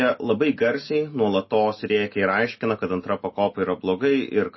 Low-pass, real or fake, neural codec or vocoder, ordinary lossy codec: 7.2 kHz; real; none; MP3, 24 kbps